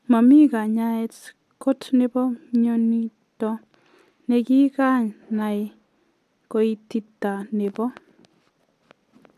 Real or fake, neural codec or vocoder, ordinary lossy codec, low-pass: real; none; AAC, 96 kbps; 14.4 kHz